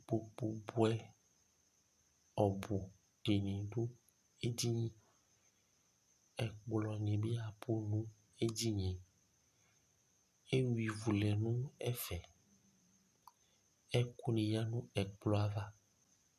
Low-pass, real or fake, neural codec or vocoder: 14.4 kHz; real; none